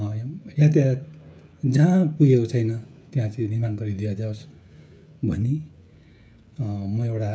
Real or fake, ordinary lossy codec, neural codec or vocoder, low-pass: fake; none; codec, 16 kHz, 16 kbps, FreqCodec, smaller model; none